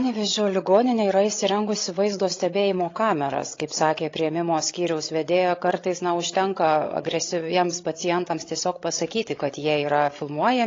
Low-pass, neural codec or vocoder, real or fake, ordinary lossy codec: 7.2 kHz; codec, 16 kHz, 16 kbps, FreqCodec, larger model; fake; AAC, 32 kbps